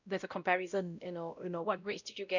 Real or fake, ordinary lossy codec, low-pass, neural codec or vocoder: fake; none; 7.2 kHz; codec, 16 kHz, 0.5 kbps, X-Codec, WavLM features, trained on Multilingual LibriSpeech